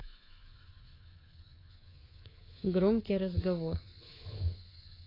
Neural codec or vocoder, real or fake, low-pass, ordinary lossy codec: codec, 24 kHz, 3.1 kbps, DualCodec; fake; 5.4 kHz; AAC, 24 kbps